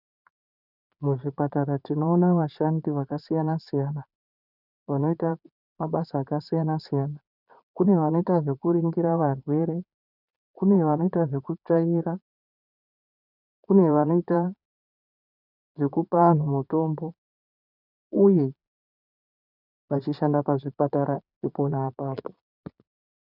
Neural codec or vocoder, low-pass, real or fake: vocoder, 22.05 kHz, 80 mel bands, Vocos; 5.4 kHz; fake